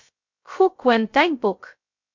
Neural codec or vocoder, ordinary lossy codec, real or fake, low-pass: codec, 16 kHz, 0.2 kbps, FocalCodec; MP3, 48 kbps; fake; 7.2 kHz